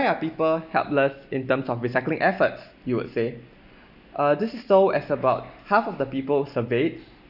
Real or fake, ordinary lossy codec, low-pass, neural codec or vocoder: real; none; 5.4 kHz; none